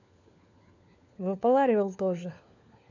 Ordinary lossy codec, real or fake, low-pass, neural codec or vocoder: none; fake; 7.2 kHz; codec, 16 kHz, 4 kbps, FunCodec, trained on LibriTTS, 50 frames a second